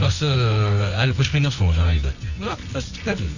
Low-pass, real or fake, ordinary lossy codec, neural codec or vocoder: 7.2 kHz; fake; none; codec, 24 kHz, 0.9 kbps, WavTokenizer, medium music audio release